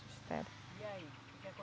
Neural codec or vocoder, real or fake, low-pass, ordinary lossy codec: none; real; none; none